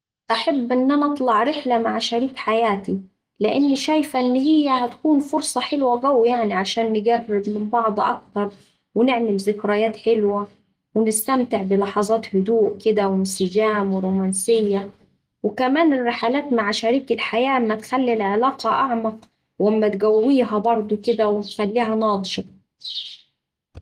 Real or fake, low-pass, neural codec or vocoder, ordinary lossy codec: real; 14.4 kHz; none; Opus, 24 kbps